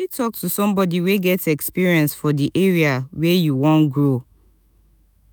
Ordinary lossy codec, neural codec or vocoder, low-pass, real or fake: none; autoencoder, 48 kHz, 128 numbers a frame, DAC-VAE, trained on Japanese speech; none; fake